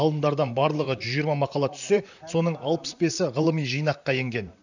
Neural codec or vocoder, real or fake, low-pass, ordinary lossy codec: vocoder, 44.1 kHz, 128 mel bands, Pupu-Vocoder; fake; 7.2 kHz; none